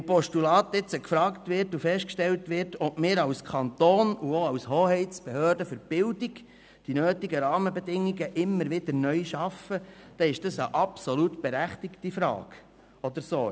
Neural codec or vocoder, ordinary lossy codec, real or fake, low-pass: none; none; real; none